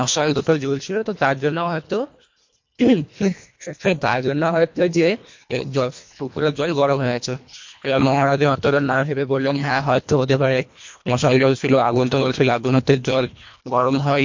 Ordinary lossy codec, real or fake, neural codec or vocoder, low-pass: MP3, 48 kbps; fake; codec, 24 kHz, 1.5 kbps, HILCodec; 7.2 kHz